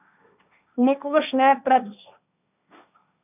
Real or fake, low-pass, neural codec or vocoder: fake; 3.6 kHz; codec, 16 kHz, 1.1 kbps, Voila-Tokenizer